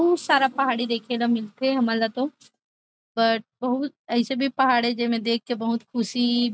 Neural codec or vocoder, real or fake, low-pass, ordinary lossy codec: none; real; none; none